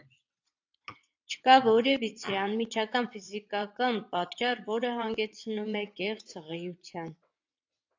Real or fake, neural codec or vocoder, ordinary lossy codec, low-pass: fake; vocoder, 22.05 kHz, 80 mel bands, WaveNeXt; AAC, 48 kbps; 7.2 kHz